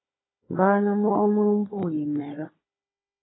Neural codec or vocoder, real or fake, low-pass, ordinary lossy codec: codec, 16 kHz, 16 kbps, FunCodec, trained on Chinese and English, 50 frames a second; fake; 7.2 kHz; AAC, 16 kbps